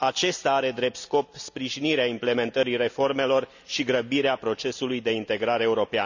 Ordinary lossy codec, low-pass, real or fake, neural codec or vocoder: none; 7.2 kHz; real; none